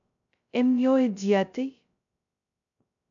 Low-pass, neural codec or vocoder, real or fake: 7.2 kHz; codec, 16 kHz, 0.2 kbps, FocalCodec; fake